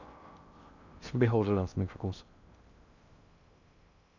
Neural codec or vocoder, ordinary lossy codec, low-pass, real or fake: codec, 16 kHz in and 24 kHz out, 0.6 kbps, FocalCodec, streaming, 2048 codes; none; 7.2 kHz; fake